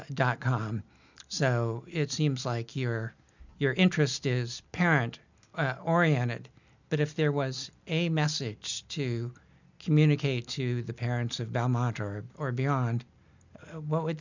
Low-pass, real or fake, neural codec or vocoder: 7.2 kHz; real; none